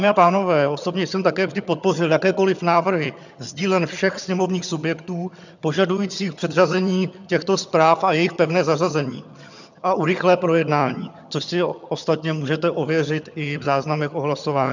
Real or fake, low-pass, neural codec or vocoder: fake; 7.2 kHz; vocoder, 22.05 kHz, 80 mel bands, HiFi-GAN